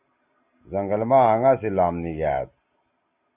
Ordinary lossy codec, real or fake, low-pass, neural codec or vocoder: Opus, 64 kbps; real; 3.6 kHz; none